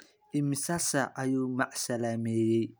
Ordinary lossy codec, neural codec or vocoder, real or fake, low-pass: none; none; real; none